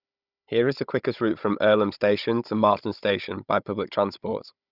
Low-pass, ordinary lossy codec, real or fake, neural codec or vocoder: 5.4 kHz; Opus, 64 kbps; fake; codec, 16 kHz, 16 kbps, FunCodec, trained on Chinese and English, 50 frames a second